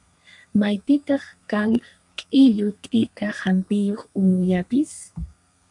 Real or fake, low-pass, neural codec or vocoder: fake; 10.8 kHz; codec, 32 kHz, 1.9 kbps, SNAC